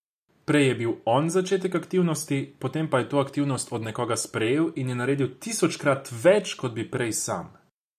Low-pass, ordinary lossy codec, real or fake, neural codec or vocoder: 14.4 kHz; MP3, 96 kbps; real; none